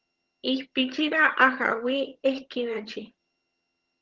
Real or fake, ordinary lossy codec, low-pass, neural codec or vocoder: fake; Opus, 16 kbps; 7.2 kHz; vocoder, 22.05 kHz, 80 mel bands, HiFi-GAN